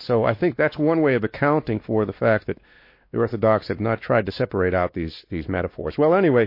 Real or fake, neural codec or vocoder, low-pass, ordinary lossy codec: fake; codec, 16 kHz, 2 kbps, X-Codec, WavLM features, trained on Multilingual LibriSpeech; 5.4 kHz; MP3, 32 kbps